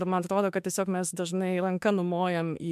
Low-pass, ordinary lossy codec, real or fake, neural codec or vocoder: 14.4 kHz; MP3, 96 kbps; fake; autoencoder, 48 kHz, 32 numbers a frame, DAC-VAE, trained on Japanese speech